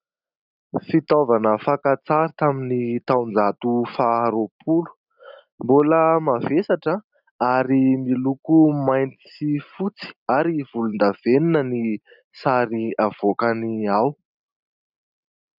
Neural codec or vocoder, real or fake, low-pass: none; real; 5.4 kHz